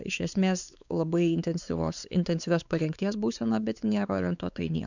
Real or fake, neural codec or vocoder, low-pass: fake; codec, 16 kHz, 4 kbps, X-Codec, WavLM features, trained on Multilingual LibriSpeech; 7.2 kHz